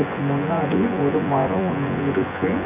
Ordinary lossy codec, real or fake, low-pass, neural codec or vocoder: none; fake; 3.6 kHz; vocoder, 24 kHz, 100 mel bands, Vocos